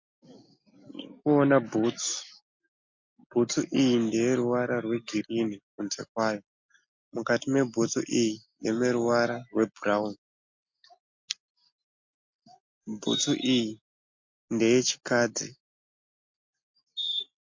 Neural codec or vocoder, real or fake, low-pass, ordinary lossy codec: none; real; 7.2 kHz; MP3, 48 kbps